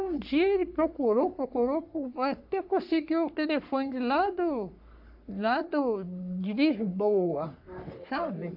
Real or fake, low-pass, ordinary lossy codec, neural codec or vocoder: fake; 5.4 kHz; AAC, 48 kbps; codec, 44.1 kHz, 3.4 kbps, Pupu-Codec